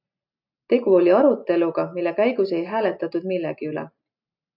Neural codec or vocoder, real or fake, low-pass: none; real; 5.4 kHz